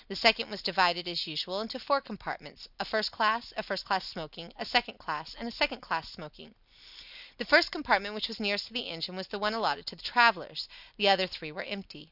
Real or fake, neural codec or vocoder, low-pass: real; none; 5.4 kHz